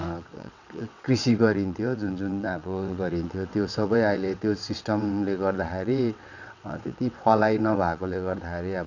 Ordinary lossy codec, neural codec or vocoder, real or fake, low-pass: none; vocoder, 22.05 kHz, 80 mel bands, WaveNeXt; fake; 7.2 kHz